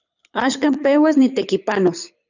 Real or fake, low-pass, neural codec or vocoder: fake; 7.2 kHz; codec, 24 kHz, 6 kbps, HILCodec